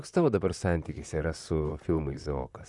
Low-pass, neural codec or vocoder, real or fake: 10.8 kHz; vocoder, 44.1 kHz, 128 mel bands, Pupu-Vocoder; fake